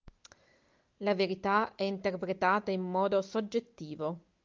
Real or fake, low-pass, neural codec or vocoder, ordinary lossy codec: real; 7.2 kHz; none; Opus, 24 kbps